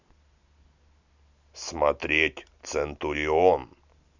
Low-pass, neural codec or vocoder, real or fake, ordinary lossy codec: 7.2 kHz; none; real; none